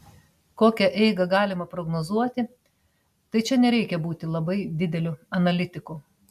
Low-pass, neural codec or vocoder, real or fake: 14.4 kHz; none; real